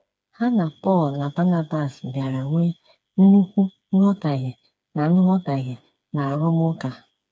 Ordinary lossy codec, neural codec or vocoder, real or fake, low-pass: none; codec, 16 kHz, 4 kbps, FreqCodec, smaller model; fake; none